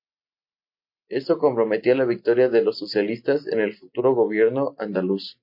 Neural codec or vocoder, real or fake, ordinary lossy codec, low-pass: none; real; MP3, 32 kbps; 5.4 kHz